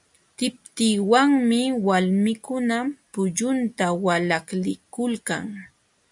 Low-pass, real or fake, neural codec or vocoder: 10.8 kHz; real; none